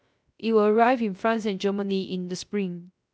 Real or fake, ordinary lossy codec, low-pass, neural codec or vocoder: fake; none; none; codec, 16 kHz, 0.3 kbps, FocalCodec